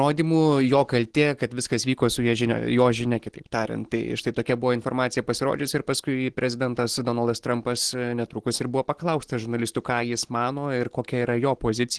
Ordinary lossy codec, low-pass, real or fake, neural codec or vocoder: Opus, 16 kbps; 10.8 kHz; real; none